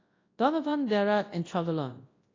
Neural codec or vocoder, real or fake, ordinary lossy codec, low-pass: codec, 24 kHz, 0.9 kbps, WavTokenizer, large speech release; fake; AAC, 32 kbps; 7.2 kHz